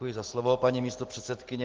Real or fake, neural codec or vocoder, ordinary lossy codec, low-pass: real; none; Opus, 16 kbps; 7.2 kHz